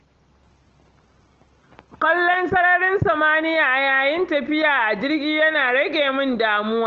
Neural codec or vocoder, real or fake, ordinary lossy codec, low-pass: none; real; Opus, 16 kbps; 7.2 kHz